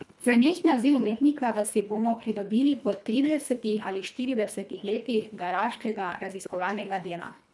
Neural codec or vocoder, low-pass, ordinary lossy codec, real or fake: codec, 24 kHz, 1.5 kbps, HILCodec; none; none; fake